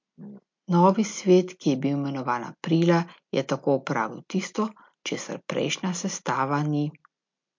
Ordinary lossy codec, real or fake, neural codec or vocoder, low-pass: MP3, 48 kbps; real; none; 7.2 kHz